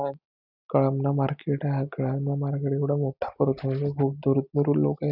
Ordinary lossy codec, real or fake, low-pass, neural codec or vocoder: none; real; 5.4 kHz; none